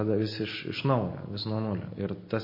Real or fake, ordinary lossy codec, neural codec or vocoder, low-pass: real; MP3, 24 kbps; none; 5.4 kHz